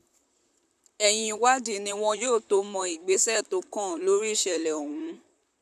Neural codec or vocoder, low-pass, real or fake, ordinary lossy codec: vocoder, 44.1 kHz, 128 mel bands, Pupu-Vocoder; 14.4 kHz; fake; none